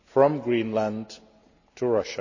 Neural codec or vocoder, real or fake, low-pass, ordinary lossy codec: none; real; 7.2 kHz; none